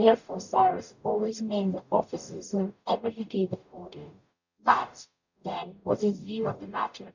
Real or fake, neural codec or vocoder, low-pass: fake; codec, 44.1 kHz, 0.9 kbps, DAC; 7.2 kHz